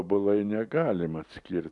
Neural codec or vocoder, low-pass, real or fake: none; 10.8 kHz; real